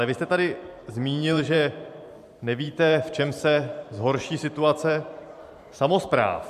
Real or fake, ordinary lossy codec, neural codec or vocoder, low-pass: fake; MP3, 96 kbps; vocoder, 44.1 kHz, 128 mel bands every 512 samples, BigVGAN v2; 14.4 kHz